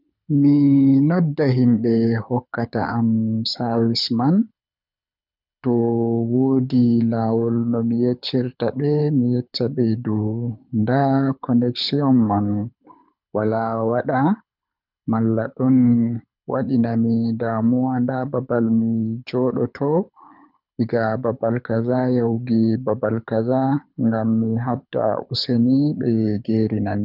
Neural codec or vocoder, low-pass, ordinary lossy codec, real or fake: codec, 24 kHz, 6 kbps, HILCodec; 5.4 kHz; none; fake